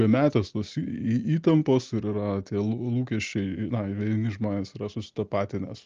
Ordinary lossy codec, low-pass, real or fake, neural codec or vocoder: Opus, 24 kbps; 7.2 kHz; real; none